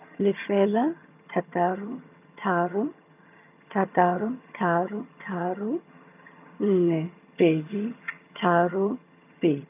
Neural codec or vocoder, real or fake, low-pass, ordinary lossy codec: vocoder, 22.05 kHz, 80 mel bands, HiFi-GAN; fake; 3.6 kHz; none